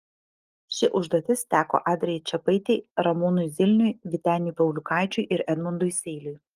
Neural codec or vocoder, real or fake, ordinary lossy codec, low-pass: none; real; Opus, 24 kbps; 14.4 kHz